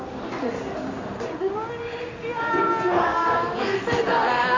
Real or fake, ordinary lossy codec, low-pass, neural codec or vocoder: fake; AAC, 32 kbps; 7.2 kHz; codec, 16 kHz in and 24 kHz out, 1 kbps, XY-Tokenizer